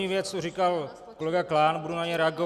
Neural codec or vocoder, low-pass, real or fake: none; 14.4 kHz; real